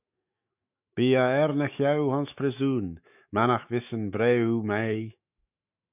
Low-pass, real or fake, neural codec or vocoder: 3.6 kHz; fake; autoencoder, 48 kHz, 128 numbers a frame, DAC-VAE, trained on Japanese speech